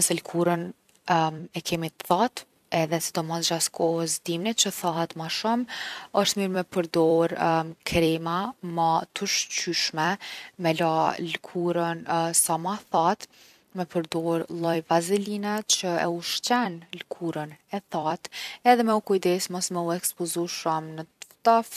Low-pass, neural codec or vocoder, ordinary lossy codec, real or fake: 14.4 kHz; vocoder, 44.1 kHz, 128 mel bands every 256 samples, BigVGAN v2; none; fake